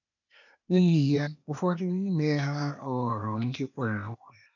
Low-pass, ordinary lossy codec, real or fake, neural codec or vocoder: 7.2 kHz; none; fake; codec, 16 kHz, 0.8 kbps, ZipCodec